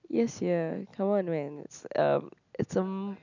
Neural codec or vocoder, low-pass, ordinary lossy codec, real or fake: none; 7.2 kHz; none; real